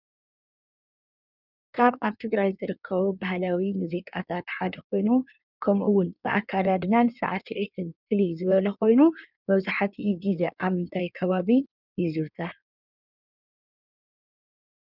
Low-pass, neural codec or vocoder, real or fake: 5.4 kHz; codec, 16 kHz in and 24 kHz out, 1.1 kbps, FireRedTTS-2 codec; fake